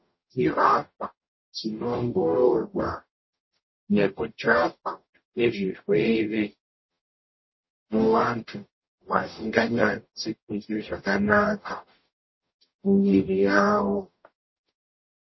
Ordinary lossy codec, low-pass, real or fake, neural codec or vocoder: MP3, 24 kbps; 7.2 kHz; fake; codec, 44.1 kHz, 0.9 kbps, DAC